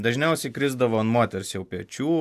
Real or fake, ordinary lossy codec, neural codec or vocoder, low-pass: real; AAC, 96 kbps; none; 14.4 kHz